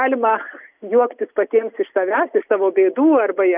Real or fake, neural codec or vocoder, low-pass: real; none; 3.6 kHz